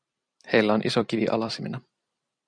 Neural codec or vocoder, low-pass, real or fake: vocoder, 44.1 kHz, 128 mel bands every 256 samples, BigVGAN v2; 9.9 kHz; fake